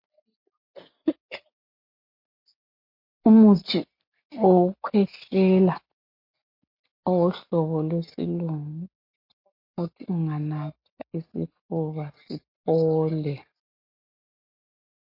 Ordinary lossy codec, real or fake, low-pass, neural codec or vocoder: MP3, 32 kbps; real; 5.4 kHz; none